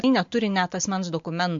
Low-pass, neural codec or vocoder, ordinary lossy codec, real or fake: 7.2 kHz; none; MP3, 48 kbps; real